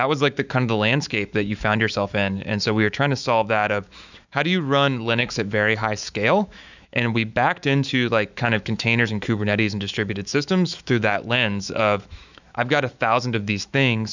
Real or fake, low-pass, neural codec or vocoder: fake; 7.2 kHz; autoencoder, 48 kHz, 128 numbers a frame, DAC-VAE, trained on Japanese speech